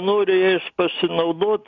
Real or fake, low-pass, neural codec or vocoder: real; 7.2 kHz; none